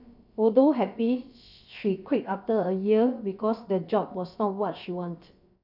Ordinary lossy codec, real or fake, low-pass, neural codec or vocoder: none; fake; 5.4 kHz; codec, 16 kHz, about 1 kbps, DyCAST, with the encoder's durations